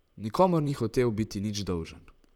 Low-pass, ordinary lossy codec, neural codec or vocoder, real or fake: 19.8 kHz; none; vocoder, 44.1 kHz, 128 mel bands, Pupu-Vocoder; fake